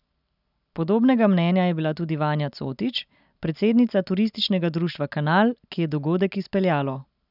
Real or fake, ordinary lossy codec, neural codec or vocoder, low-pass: real; none; none; 5.4 kHz